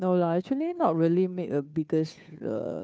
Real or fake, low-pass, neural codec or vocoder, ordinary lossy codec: fake; none; codec, 16 kHz, 2 kbps, FunCodec, trained on Chinese and English, 25 frames a second; none